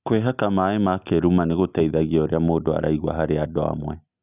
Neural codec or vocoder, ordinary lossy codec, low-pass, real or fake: none; none; 3.6 kHz; real